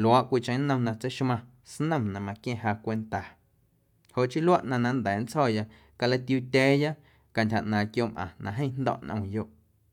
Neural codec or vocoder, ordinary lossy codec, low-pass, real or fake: none; none; 19.8 kHz; real